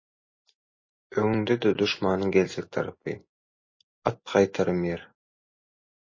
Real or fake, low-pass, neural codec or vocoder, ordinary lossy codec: real; 7.2 kHz; none; MP3, 32 kbps